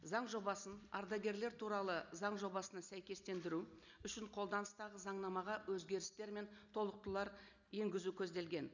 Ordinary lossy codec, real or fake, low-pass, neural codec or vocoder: none; real; 7.2 kHz; none